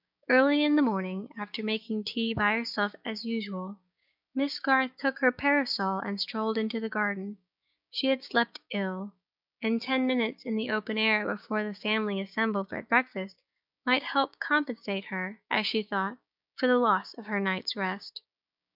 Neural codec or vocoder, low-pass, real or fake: codec, 16 kHz, 6 kbps, DAC; 5.4 kHz; fake